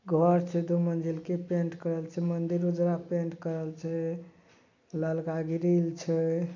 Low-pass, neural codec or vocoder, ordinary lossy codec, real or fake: 7.2 kHz; none; none; real